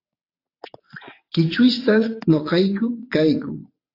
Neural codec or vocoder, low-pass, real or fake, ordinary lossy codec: vocoder, 22.05 kHz, 80 mel bands, WaveNeXt; 5.4 kHz; fake; AAC, 32 kbps